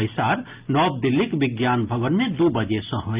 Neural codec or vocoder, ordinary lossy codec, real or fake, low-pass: none; Opus, 24 kbps; real; 3.6 kHz